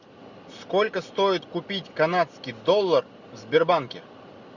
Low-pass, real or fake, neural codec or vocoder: 7.2 kHz; real; none